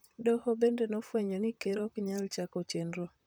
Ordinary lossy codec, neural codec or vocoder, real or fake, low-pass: none; vocoder, 44.1 kHz, 128 mel bands, Pupu-Vocoder; fake; none